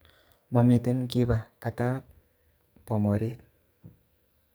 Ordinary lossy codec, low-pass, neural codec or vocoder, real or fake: none; none; codec, 44.1 kHz, 2.6 kbps, SNAC; fake